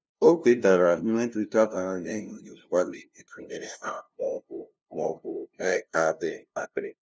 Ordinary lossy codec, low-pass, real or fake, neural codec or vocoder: none; none; fake; codec, 16 kHz, 0.5 kbps, FunCodec, trained on LibriTTS, 25 frames a second